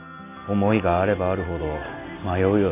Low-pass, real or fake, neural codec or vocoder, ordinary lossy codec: 3.6 kHz; real; none; AAC, 24 kbps